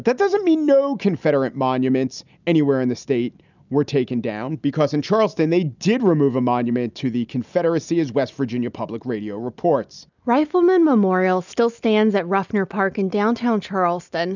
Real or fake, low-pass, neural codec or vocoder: real; 7.2 kHz; none